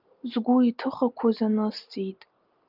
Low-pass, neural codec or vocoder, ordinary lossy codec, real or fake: 5.4 kHz; none; Opus, 24 kbps; real